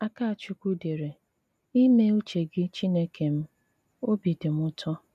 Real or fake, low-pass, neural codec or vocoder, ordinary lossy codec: real; 14.4 kHz; none; none